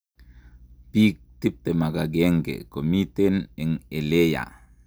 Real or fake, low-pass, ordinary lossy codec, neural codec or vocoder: real; none; none; none